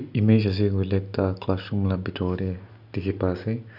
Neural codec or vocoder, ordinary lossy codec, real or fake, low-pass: none; none; real; 5.4 kHz